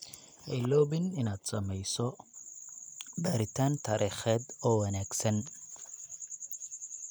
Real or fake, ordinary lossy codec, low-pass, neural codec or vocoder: real; none; none; none